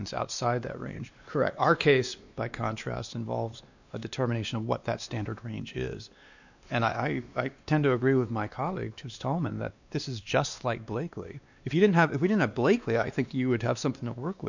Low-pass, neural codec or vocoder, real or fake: 7.2 kHz; codec, 16 kHz, 2 kbps, X-Codec, WavLM features, trained on Multilingual LibriSpeech; fake